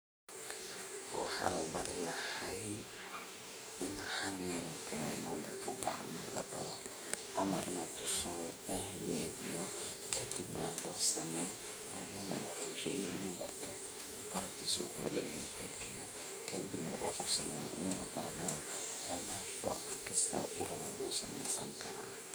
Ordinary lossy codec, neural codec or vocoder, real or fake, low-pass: none; codec, 44.1 kHz, 2.6 kbps, DAC; fake; none